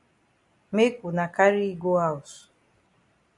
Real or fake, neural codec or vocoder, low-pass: real; none; 10.8 kHz